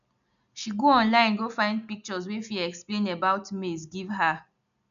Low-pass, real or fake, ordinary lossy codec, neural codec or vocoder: 7.2 kHz; real; none; none